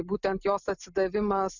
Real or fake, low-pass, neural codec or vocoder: real; 7.2 kHz; none